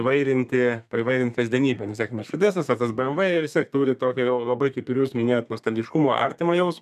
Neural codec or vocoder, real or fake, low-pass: codec, 32 kHz, 1.9 kbps, SNAC; fake; 14.4 kHz